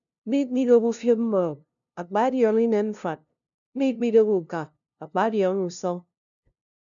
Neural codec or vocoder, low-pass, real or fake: codec, 16 kHz, 0.5 kbps, FunCodec, trained on LibriTTS, 25 frames a second; 7.2 kHz; fake